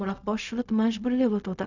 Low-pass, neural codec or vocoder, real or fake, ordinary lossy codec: 7.2 kHz; codec, 16 kHz, 0.4 kbps, LongCat-Audio-Codec; fake; none